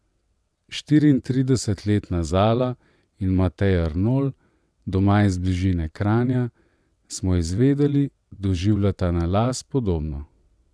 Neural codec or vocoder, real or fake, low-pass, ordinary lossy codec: vocoder, 22.05 kHz, 80 mel bands, WaveNeXt; fake; none; none